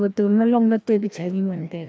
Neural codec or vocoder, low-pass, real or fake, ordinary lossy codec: codec, 16 kHz, 1 kbps, FreqCodec, larger model; none; fake; none